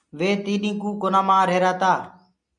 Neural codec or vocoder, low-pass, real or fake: none; 9.9 kHz; real